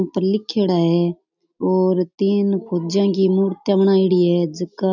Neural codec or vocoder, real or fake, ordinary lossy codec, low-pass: none; real; none; 7.2 kHz